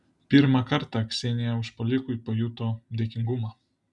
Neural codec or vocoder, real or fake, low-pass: none; real; 10.8 kHz